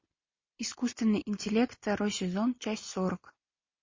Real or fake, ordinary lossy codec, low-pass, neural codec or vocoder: real; MP3, 32 kbps; 7.2 kHz; none